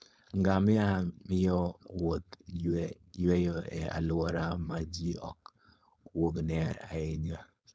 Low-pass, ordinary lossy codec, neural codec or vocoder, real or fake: none; none; codec, 16 kHz, 4.8 kbps, FACodec; fake